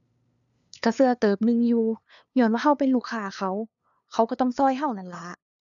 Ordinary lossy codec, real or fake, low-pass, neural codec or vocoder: none; fake; 7.2 kHz; codec, 16 kHz, 2 kbps, FunCodec, trained on LibriTTS, 25 frames a second